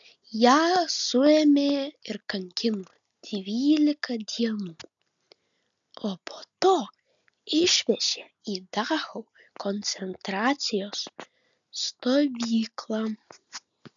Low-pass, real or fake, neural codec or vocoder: 7.2 kHz; real; none